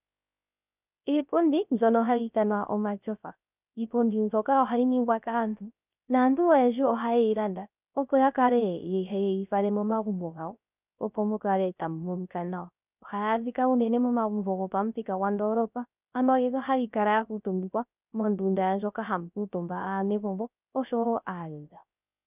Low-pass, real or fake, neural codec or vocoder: 3.6 kHz; fake; codec, 16 kHz, 0.3 kbps, FocalCodec